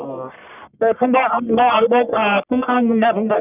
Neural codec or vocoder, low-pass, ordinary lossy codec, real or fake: codec, 44.1 kHz, 1.7 kbps, Pupu-Codec; 3.6 kHz; none; fake